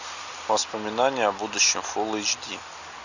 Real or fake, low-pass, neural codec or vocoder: real; 7.2 kHz; none